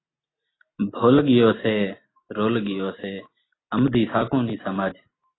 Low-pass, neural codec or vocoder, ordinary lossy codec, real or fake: 7.2 kHz; none; AAC, 16 kbps; real